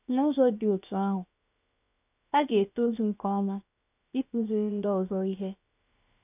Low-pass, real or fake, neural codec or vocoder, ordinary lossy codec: 3.6 kHz; fake; codec, 16 kHz, 0.7 kbps, FocalCodec; none